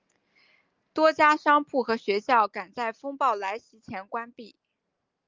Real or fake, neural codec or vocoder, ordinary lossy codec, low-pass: real; none; Opus, 24 kbps; 7.2 kHz